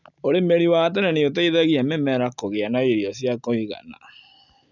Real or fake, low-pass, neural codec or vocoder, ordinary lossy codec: real; 7.2 kHz; none; none